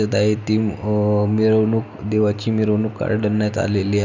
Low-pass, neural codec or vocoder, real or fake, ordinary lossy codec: 7.2 kHz; none; real; none